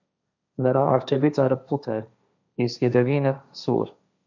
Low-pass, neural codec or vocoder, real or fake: 7.2 kHz; codec, 16 kHz, 1.1 kbps, Voila-Tokenizer; fake